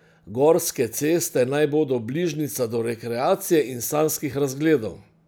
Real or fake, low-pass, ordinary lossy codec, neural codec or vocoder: real; none; none; none